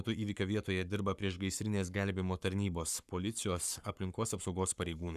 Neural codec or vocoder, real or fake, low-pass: codec, 44.1 kHz, 7.8 kbps, Pupu-Codec; fake; 14.4 kHz